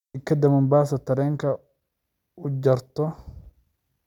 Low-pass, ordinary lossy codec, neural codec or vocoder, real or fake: 19.8 kHz; none; none; real